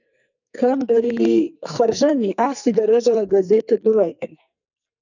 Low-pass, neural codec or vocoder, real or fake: 7.2 kHz; codec, 44.1 kHz, 2.6 kbps, SNAC; fake